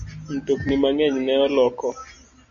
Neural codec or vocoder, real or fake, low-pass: none; real; 7.2 kHz